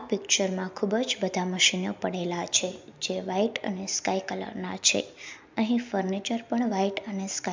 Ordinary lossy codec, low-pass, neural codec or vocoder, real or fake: MP3, 64 kbps; 7.2 kHz; none; real